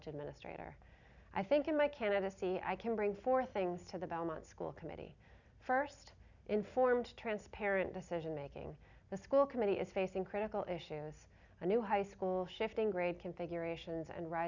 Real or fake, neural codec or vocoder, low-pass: real; none; 7.2 kHz